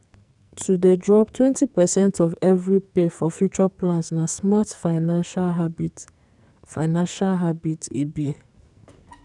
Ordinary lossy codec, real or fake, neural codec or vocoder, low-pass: none; fake; codec, 44.1 kHz, 2.6 kbps, SNAC; 10.8 kHz